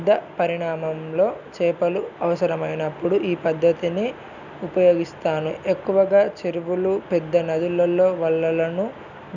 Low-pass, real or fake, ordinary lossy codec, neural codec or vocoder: 7.2 kHz; real; none; none